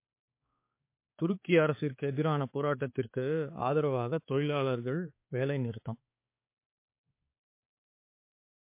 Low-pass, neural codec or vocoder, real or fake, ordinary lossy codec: 3.6 kHz; codec, 16 kHz, 4 kbps, X-Codec, HuBERT features, trained on balanced general audio; fake; MP3, 24 kbps